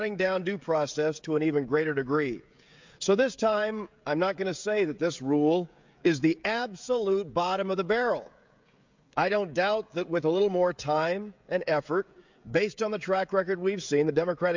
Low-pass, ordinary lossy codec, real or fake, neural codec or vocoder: 7.2 kHz; MP3, 64 kbps; fake; codec, 16 kHz, 16 kbps, FreqCodec, smaller model